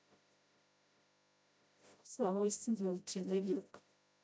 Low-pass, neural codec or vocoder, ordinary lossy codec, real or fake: none; codec, 16 kHz, 0.5 kbps, FreqCodec, smaller model; none; fake